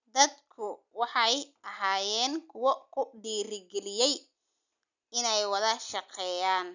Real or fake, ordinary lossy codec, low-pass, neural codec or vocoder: real; none; 7.2 kHz; none